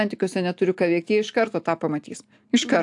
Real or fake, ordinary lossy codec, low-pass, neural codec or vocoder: real; AAC, 64 kbps; 10.8 kHz; none